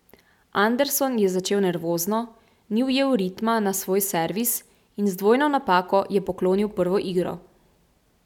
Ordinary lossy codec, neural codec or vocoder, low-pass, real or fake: none; none; 19.8 kHz; real